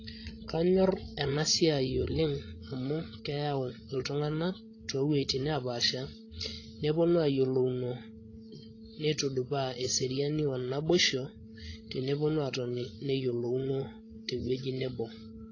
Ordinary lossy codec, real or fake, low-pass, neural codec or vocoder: AAC, 32 kbps; fake; 7.2 kHz; codec, 16 kHz, 16 kbps, FreqCodec, larger model